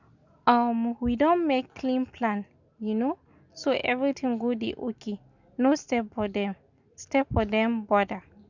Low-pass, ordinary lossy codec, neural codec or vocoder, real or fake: 7.2 kHz; none; none; real